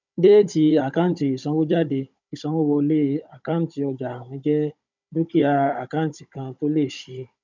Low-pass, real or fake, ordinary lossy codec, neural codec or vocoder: 7.2 kHz; fake; none; codec, 16 kHz, 16 kbps, FunCodec, trained on Chinese and English, 50 frames a second